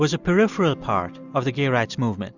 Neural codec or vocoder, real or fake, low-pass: none; real; 7.2 kHz